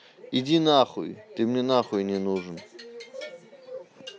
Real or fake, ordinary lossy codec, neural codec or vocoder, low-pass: real; none; none; none